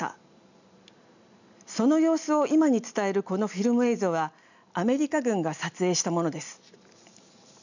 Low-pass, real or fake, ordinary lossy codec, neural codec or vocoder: 7.2 kHz; real; none; none